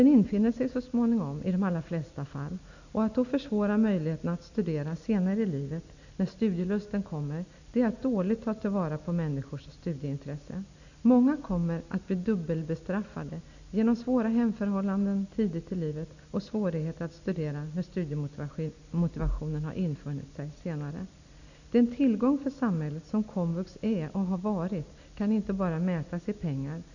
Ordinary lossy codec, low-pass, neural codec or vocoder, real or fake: none; 7.2 kHz; none; real